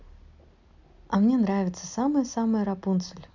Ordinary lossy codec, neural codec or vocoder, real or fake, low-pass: none; none; real; 7.2 kHz